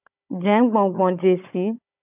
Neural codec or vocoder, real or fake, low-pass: codec, 16 kHz, 16 kbps, FunCodec, trained on Chinese and English, 50 frames a second; fake; 3.6 kHz